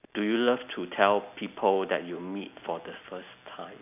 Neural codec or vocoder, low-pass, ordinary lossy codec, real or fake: none; 3.6 kHz; none; real